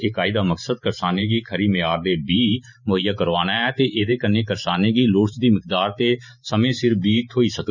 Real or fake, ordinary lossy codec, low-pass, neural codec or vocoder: fake; none; 7.2 kHz; vocoder, 44.1 kHz, 128 mel bands every 512 samples, BigVGAN v2